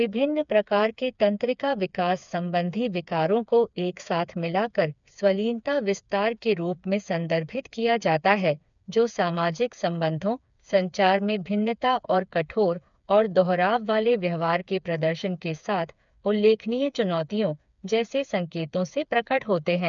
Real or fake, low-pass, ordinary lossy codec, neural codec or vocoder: fake; 7.2 kHz; none; codec, 16 kHz, 4 kbps, FreqCodec, smaller model